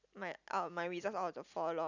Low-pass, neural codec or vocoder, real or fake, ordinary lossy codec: 7.2 kHz; none; real; none